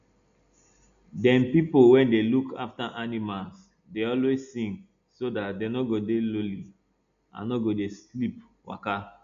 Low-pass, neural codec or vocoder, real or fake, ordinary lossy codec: 7.2 kHz; none; real; none